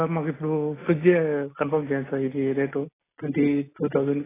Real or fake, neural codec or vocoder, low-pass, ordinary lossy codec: real; none; 3.6 kHz; AAC, 16 kbps